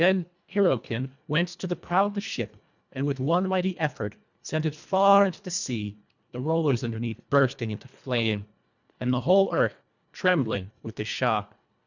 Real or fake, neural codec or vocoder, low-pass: fake; codec, 24 kHz, 1.5 kbps, HILCodec; 7.2 kHz